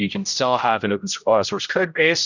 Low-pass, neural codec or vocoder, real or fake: 7.2 kHz; codec, 16 kHz, 0.5 kbps, X-Codec, HuBERT features, trained on general audio; fake